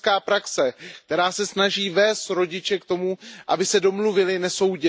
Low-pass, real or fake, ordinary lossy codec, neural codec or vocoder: none; real; none; none